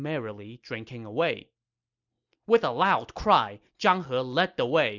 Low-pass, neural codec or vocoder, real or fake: 7.2 kHz; none; real